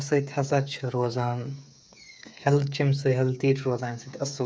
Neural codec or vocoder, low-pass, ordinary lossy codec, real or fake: codec, 16 kHz, 8 kbps, FreqCodec, smaller model; none; none; fake